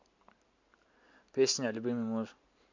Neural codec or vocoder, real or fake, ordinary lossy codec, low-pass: none; real; MP3, 64 kbps; 7.2 kHz